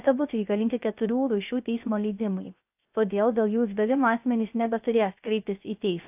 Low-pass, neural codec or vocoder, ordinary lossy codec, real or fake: 3.6 kHz; codec, 16 kHz, 0.3 kbps, FocalCodec; AAC, 32 kbps; fake